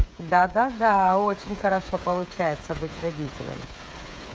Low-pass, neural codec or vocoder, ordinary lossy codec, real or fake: none; codec, 16 kHz, 8 kbps, FreqCodec, smaller model; none; fake